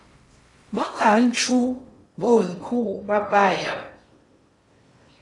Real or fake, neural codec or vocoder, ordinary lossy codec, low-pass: fake; codec, 16 kHz in and 24 kHz out, 0.6 kbps, FocalCodec, streaming, 4096 codes; AAC, 32 kbps; 10.8 kHz